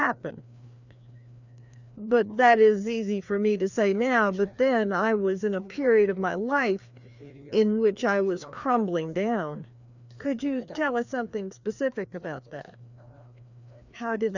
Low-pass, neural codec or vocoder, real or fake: 7.2 kHz; codec, 16 kHz, 2 kbps, FreqCodec, larger model; fake